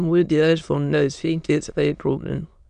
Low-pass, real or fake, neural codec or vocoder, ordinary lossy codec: 9.9 kHz; fake; autoencoder, 22.05 kHz, a latent of 192 numbers a frame, VITS, trained on many speakers; none